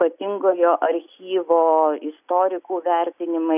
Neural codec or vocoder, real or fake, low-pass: none; real; 3.6 kHz